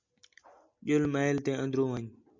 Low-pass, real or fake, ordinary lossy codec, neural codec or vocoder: 7.2 kHz; real; MP3, 64 kbps; none